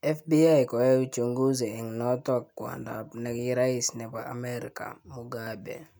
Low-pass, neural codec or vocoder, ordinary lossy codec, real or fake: none; none; none; real